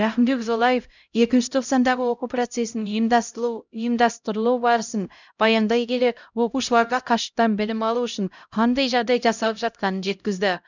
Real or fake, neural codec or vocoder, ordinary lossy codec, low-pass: fake; codec, 16 kHz, 0.5 kbps, X-Codec, HuBERT features, trained on LibriSpeech; none; 7.2 kHz